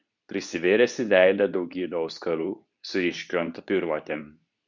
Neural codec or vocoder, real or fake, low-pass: codec, 24 kHz, 0.9 kbps, WavTokenizer, medium speech release version 2; fake; 7.2 kHz